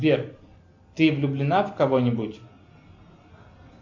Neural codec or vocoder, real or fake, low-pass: none; real; 7.2 kHz